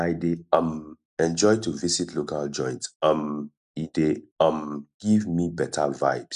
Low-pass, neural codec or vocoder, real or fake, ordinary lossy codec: 10.8 kHz; none; real; none